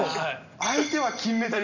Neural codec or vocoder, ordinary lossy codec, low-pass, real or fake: none; none; 7.2 kHz; real